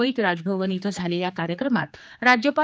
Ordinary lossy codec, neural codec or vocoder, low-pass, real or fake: none; codec, 16 kHz, 2 kbps, X-Codec, HuBERT features, trained on general audio; none; fake